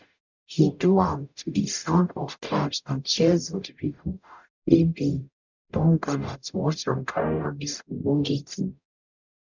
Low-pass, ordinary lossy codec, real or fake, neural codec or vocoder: 7.2 kHz; none; fake; codec, 44.1 kHz, 0.9 kbps, DAC